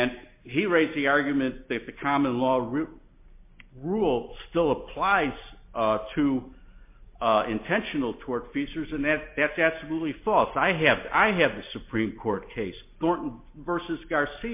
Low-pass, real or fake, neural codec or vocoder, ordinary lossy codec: 3.6 kHz; real; none; MP3, 24 kbps